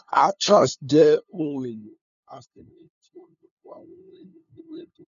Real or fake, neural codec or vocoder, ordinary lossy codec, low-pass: fake; codec, 16 kHz, 2 kbps, FunCodec, trained on LibriTTS, 25 frames a second; none; 7.2 kHz